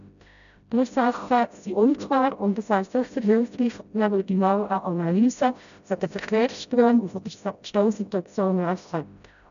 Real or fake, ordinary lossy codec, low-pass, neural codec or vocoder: fake; none; 7.2 kHz; codec, 16 kHz, 0.5 kbps, FreqCodec, smaller model